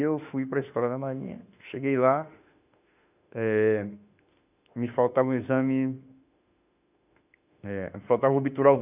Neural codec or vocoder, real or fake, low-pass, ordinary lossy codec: autoencoder, 48 kHz, 32 numbers a frame, DAC-VAE, trained on Japanese speech; fake; 3.6 kHz; none